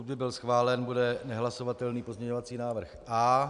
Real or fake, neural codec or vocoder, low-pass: real; none; 10.8 kHz